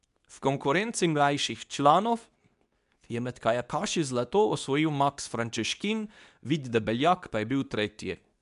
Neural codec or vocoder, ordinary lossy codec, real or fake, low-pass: codec, 24 kHz, 0.9 kbps, WavTokenizer, medium speech release version 2; none; fake; 10.8 kHz